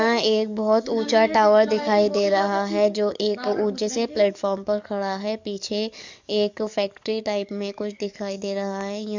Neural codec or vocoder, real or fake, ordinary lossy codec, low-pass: codec, 44.1 kHz, 7.8 kbps, DAC; fake; MP3, 64 kbps; 7.2 kHz